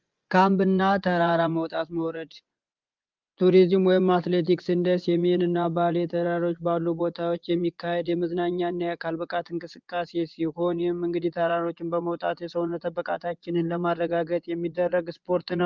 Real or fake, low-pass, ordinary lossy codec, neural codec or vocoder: fake; 7.2 kHz; Opus, 32 kbps; vocoder, 24 kHz, 100 mel bands, Vocos